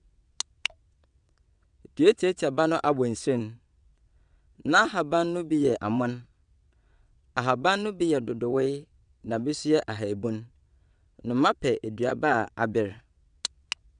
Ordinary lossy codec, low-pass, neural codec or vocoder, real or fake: none; 9.9 kHz; vocoder, 22.05 kHz, 80 mel bands, WaveNeXt; fake